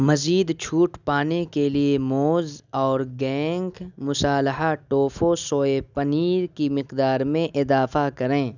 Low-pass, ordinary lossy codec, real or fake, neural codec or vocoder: 7.2 kHz; none; real; none